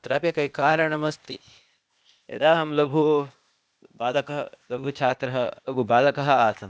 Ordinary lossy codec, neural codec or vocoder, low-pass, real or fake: none; codec, 16 kHz, 0.8 kbps, ZipCodec; none; fake